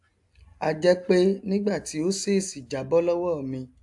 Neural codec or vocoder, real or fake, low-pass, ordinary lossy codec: none; real; 10.8 kHz; AAC, 64 kbps